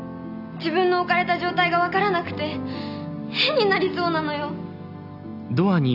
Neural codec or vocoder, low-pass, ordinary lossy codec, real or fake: none; 5.4 kHz; none; real